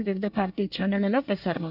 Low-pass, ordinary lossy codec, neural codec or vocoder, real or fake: 5.4 kHz; none; codec, 24 kHz, 1 kbps, SNAC; fake